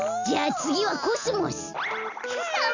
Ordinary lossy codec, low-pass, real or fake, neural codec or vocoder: none; 7.2 kHz; real; none